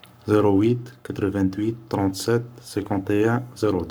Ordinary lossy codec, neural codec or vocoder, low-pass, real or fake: none; codec, 44.1 kHz, 7.8 kbps, Pupu-Codec; none; fake